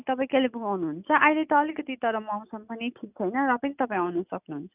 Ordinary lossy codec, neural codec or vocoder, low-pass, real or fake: none; none; 3.6 kHz; real